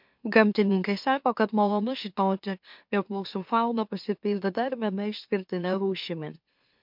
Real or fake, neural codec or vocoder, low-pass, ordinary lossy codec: fake; autoencoder, 44.1 kHz, a latent of 192 numbers a frame, MeloTTS; 5.4 kHz; MP3, 48 kbps